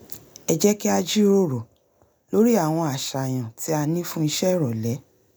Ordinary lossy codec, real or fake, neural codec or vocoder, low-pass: none; real; none; none